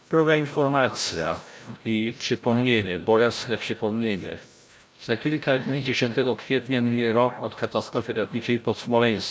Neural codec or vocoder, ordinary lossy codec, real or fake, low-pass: codec, 16 kHz, 0.5 kbps, FreqCodec, larger model; none; fake; none